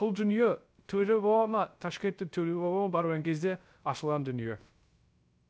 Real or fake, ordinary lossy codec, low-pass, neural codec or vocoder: fake; none; none; codec, 16 kHz, 0.3 kbps, FocalCodec